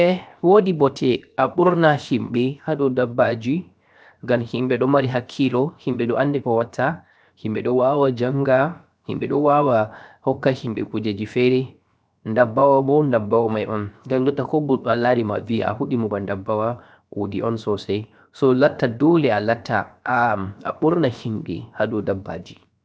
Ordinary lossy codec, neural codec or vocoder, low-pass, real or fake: none; codec, 16 kHz, 0.7 kbps, FocalCodec; none; fake